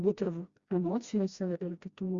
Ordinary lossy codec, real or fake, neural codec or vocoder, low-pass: Opus, 64 kbps; fake; codec, 16 kHz, 1 kbps, FreqCodec, smaller model; 7.2 kHz